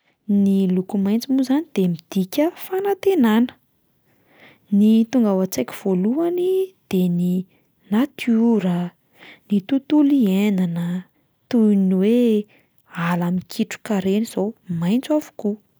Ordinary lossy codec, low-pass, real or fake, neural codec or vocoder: none; none; real; none